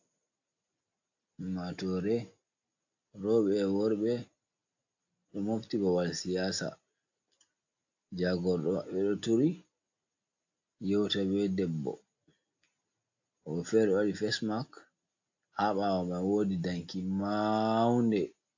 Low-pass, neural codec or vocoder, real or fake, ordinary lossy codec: 7.2 kHz; none; real; AAC, 48 kbps